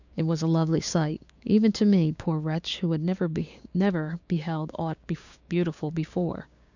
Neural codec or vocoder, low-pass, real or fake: codec, 16 kHz, 6 kbps, DAC; 7.2 kHz; fake